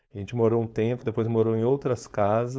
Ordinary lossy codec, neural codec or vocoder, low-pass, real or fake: none; codec, 16 kHz, 4.8 kbps, FACodec; none; fake